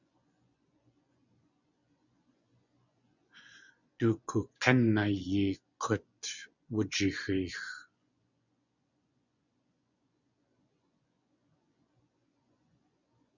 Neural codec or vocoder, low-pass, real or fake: vocoder, 24 kHz, 100 mel bands, Vocos; 7.2 kHz; fake